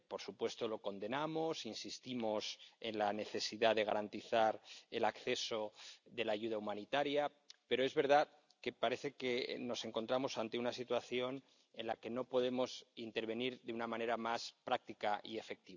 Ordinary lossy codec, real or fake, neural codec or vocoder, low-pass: none; real; none; 7.2 kHz